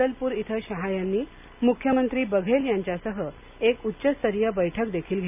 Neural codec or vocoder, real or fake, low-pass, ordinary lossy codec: none; real; 3.6 kHz; none